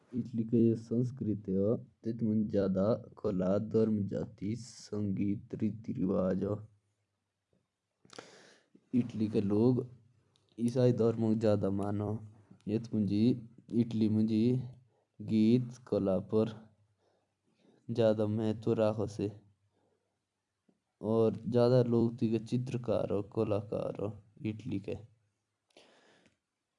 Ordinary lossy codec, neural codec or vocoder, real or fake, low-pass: none; vocoder, 44.1 kHz, 128 mel bands every 256 samples, BigVGAN v2; fake; 10.8 kHz